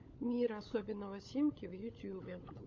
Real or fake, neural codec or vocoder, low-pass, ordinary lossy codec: fake; codec, 16 kHz, 16 kbps, FunCodec, trained on LibriTTS, 50 frames a second; 7.2 kHz; AAC, 48 kbps